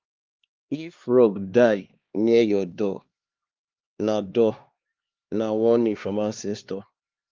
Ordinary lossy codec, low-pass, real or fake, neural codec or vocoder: Opus, 32 kbps; 7.2 kHz; fake; codec, 16 kHz, 2 kbps, X-Codec, HuBERT features, trained on LibriSpeech